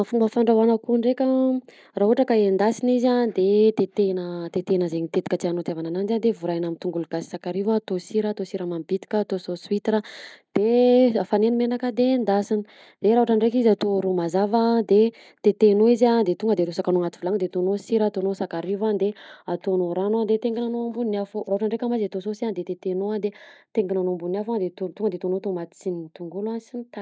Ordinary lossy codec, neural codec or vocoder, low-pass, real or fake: none; none; none; real